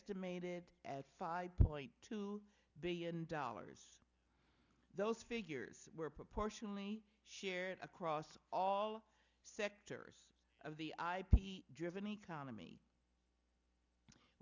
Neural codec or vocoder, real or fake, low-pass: none; real; 7.2 kHz